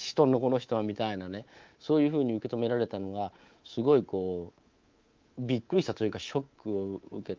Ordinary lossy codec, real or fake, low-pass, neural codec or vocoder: Opus, 32 kbps; fake; 7.2 kHz; codec, 24 kHz, 3.1 kbps, DualCodec